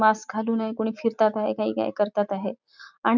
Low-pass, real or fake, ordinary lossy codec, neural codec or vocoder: 7.2 kHz; real; none; none